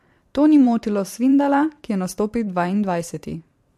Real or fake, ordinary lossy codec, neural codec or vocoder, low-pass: fake; MP3, 64 kbps; vocoder, 44.1 kHz, 128 mel bands every 512 samples, BigVGAN v2; 14.4 kHz